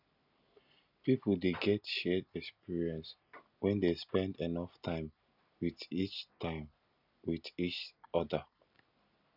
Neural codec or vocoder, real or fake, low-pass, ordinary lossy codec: none; real; 5.4 kHz; none